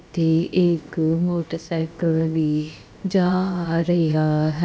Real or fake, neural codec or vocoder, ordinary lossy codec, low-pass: fake; codec, 16 kHz, about 1 kbps, DyCAST, with the encoder's durations; none; none